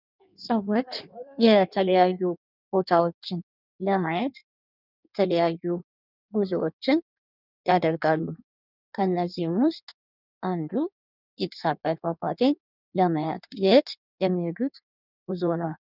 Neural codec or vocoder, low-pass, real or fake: codec, 16 kHz in and 24 kHz out, 1.1 kbps, FireRedTTS-2 codec; 5.4 kHz; fake